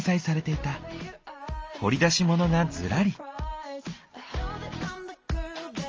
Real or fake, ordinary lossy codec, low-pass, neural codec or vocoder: real; Opus, 24 kbps; 7.2 kHz; none